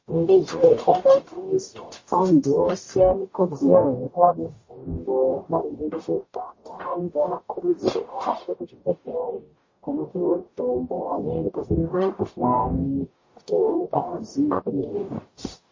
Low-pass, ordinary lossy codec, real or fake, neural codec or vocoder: 7.2 kHz; MP3, 32 kbps; fake; codec, 44.1 kHz, 0.9 kbps, DAC